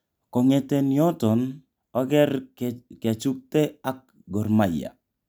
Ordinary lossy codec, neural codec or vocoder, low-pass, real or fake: none; none; none; real